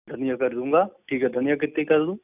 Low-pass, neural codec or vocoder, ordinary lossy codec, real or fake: 3.6 kHz; none; none; real